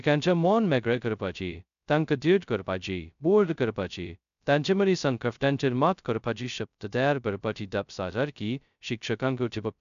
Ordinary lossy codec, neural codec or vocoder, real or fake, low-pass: none; codec, 16 kHz, 0.2 kbps, FocalCodec; fake; 7.2 kHz